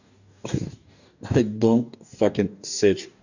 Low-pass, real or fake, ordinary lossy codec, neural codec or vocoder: 7.2 kHz; fake; none; codec, 44.1 kHz, 2.6 kbps, DAC